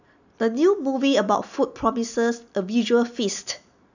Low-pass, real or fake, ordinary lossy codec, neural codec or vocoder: 7.2 kHz; real; none; none